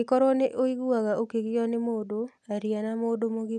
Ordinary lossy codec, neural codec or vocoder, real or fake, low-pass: none; none; real; none